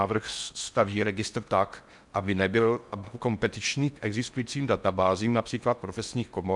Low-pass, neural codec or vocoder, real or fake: 10.8 kHz; codec, 16 kHz in and 24 kHz out, 0.6 kbps, FocalCodec, streaming, 4096 codes; fake